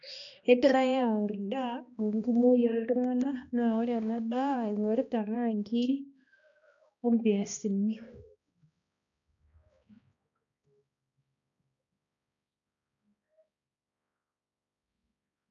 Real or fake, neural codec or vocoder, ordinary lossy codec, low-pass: fake; codec, 16 kHz, 1 kbps, X-Codec, HuBERT features, trained on balanced general audio; none; 7.2 kHz